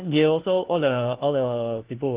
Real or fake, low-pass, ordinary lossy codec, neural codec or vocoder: fake; 3.6 kHz; Opus, 16 kbps; codec, 16 kHz, 1 kbps, FunCodec, trained on LibriTTS, 50 frames a second